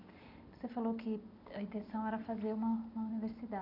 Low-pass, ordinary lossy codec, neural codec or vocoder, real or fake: 5.4 kHz; none; none; real